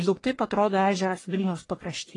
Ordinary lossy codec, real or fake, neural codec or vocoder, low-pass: AAC, 32 kbps; fake; codec, 44.1 kHz, 1.7 kbps, Pupu-Codec; 10.8 kHz